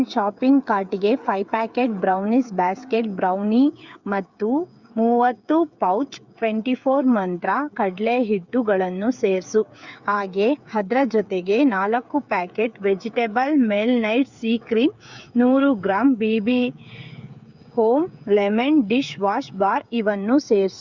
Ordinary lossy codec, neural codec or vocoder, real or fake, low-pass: Opus, 64 kbps; codec, 16 kHz, 8 kbps, FreqCodec, smaller model; fake; 7.2 kHz